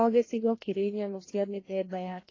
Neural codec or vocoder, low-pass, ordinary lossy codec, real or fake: codec, 16 kHz, 1 kbps, FreqCodec, larger model; 7.2 kHz; AAC, 32 kbps; fake